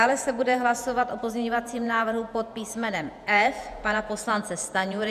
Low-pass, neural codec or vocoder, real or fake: 14.4 kHz; vocoder, 48 kHz, 128 mel bands, Vocos; fake